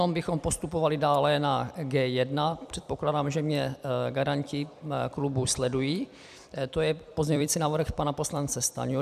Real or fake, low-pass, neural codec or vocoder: fake; 14.4 kHz; vocoder, 44.1 kHz, 128 mel bands every 256 samples, BigVGAN v2